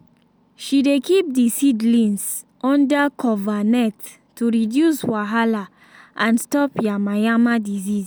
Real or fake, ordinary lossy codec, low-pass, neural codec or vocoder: real; none; none; none